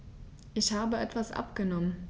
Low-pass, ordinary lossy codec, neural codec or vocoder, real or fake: none; none; none; real